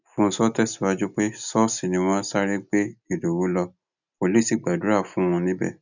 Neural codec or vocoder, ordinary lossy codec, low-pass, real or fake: none; none; 7.2 kHz; real